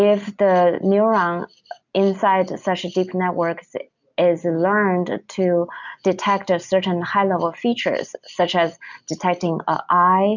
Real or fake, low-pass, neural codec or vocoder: real; 7.2 kHz; none